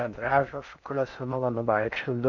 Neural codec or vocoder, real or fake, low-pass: codec, 16 kHz in and 24 kHz out, 0.6 kbps, FocalCodec, streaming, 4096 codes; fake; 7.2 kHz